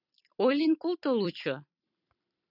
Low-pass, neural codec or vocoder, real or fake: 5.4 kHz; vocoder, 44.1 kHz, 128 mel bands every 256 samples, BigVGAN v2; fake